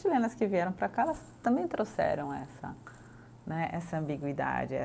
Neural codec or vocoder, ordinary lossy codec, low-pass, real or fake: none; none; none; real